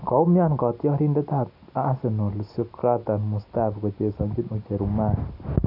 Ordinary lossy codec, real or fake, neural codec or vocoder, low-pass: none; real; none; 5.4 kHz